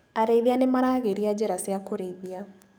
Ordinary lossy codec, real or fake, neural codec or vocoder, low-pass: none; fake; codec, 44.1 kHz, 7.8 kbps, DAC; none